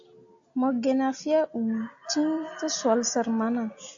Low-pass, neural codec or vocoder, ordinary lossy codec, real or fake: 7.2 kHz; none; AAC, 64 kbps; real